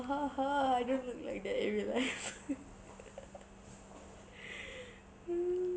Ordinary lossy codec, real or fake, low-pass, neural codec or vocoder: none; real; none; none